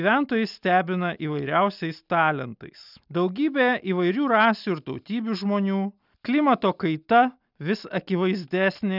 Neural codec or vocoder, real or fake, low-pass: none; real; 5.4 kHz